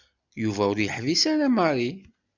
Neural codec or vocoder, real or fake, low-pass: none; real; 7.2 kHz